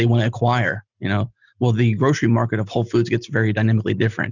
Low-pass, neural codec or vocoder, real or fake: 7.2 kHz; none; real